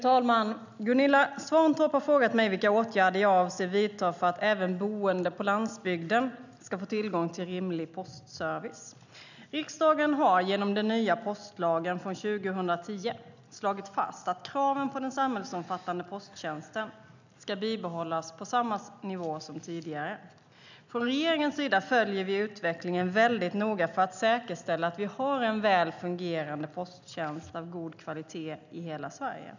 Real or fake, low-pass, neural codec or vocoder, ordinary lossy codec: real; 7.2 kHz; none; none